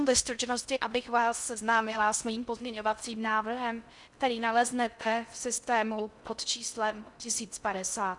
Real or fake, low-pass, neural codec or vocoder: fake; 10.8 kHz; codec, 16 kHz in and 24 kHz out, 0.6 kbps, FocalCodec, streaming, 4096 codes